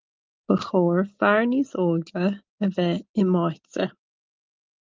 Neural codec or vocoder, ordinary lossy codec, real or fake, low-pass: none; Opus, 32 kbps; real; 7.2 kHz